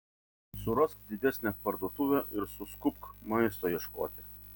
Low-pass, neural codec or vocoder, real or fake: 19.8 kHz; none; real